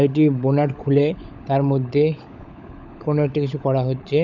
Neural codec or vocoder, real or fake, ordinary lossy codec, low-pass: codec, 16 kHz, 16 kbps, FunCodec, trained on LibriTTS, 50 frames a second; fake; none; 7.2 kHz